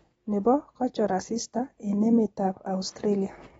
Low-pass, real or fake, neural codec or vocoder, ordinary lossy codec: 19.8 kHz; real; none; AAC, 24 kbps